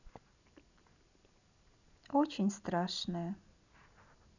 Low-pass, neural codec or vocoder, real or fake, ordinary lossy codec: 7.2 kHz; none; real; none